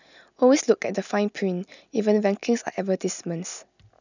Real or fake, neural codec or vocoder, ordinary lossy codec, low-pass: real; none; none; 7.2 kHz